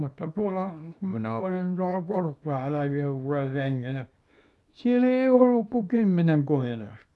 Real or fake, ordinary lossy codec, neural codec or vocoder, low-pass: fake; none; codec, 24 kHz, 0.9 kbps, WavTokenizer, small release; none